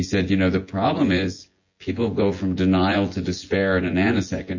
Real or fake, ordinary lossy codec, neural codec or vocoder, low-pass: fake; MP3, 32 kbps; vocoder, 24 kHz, 100 mel bands, Vocos; 7.2 kHz